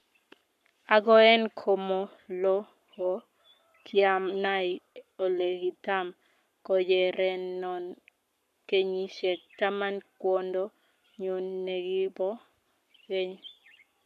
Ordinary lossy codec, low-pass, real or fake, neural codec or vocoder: none; 14.4 kHz; fake; codec, 44.1 kHz, 7.8 kbps, Pupu-Codec